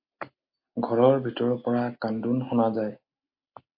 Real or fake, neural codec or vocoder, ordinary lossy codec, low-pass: real; none; MP3, 32 kbps; 5.4 kHz